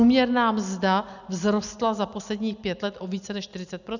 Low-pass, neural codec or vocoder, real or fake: 7.2 kHz; none; real